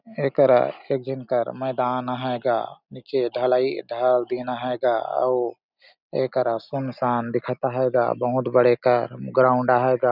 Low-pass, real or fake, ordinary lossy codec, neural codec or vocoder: 5.4 kHz; real; none; none